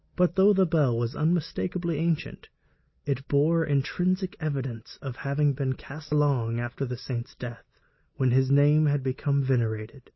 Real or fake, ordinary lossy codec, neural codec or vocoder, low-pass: real; MP3, 24 kbps; none; 7.2 kHz